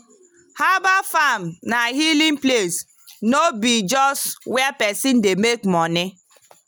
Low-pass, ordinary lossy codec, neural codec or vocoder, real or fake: none; none; none; real